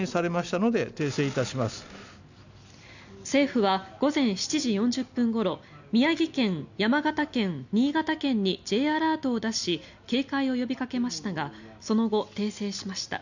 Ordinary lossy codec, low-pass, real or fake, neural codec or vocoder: none; 7.2 kHz; real; none